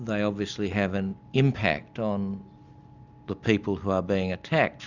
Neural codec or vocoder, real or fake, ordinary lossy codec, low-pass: none; real; Opus, 64 kbps; 7.2 kHz